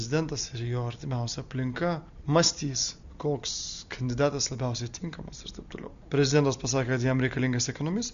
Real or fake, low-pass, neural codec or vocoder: real; 7.2 kHz; none